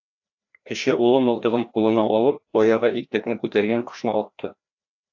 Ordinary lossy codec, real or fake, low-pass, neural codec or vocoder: AAC, 48 kbps; fake; 7.2 kHz; codec, 16 kHz, 1 kbps, FreqCodec, larger model